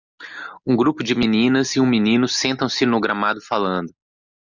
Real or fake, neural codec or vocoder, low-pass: real; none; 7.2 kHz